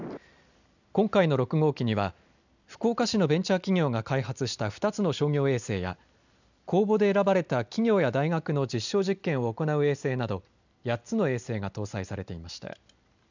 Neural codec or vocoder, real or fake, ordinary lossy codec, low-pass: none; real; none; 7.2 kHz